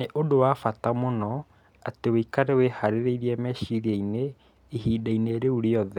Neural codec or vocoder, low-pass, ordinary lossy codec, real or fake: none; 19.8 kHz; none; real